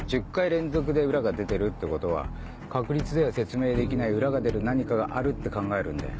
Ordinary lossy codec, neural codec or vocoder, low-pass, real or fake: none; none; none; real